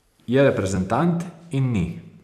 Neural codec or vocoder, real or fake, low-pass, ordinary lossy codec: vocoder, 44.1 kHz, 128 mel bands every 256 samples, BigVGAN v2; fake; 14.4 kHz; none